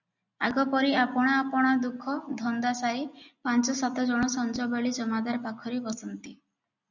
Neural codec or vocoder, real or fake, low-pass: none; real; 7.2 kHz